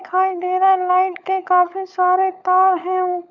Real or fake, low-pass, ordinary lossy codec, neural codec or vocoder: fake; 7.2 kHz; none; codec, 16 kHz, 16 kbps, FunCodec, trained on LibriTTS, 50 frames a second